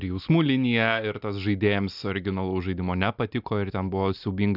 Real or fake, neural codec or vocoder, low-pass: real; none; 5.4 kHz